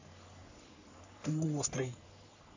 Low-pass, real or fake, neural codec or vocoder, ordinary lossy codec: 7.2 kHz; fake; codec, 44.1 kHz, 3.4 kbps, Pupu-Codec; AAC, 48 kbps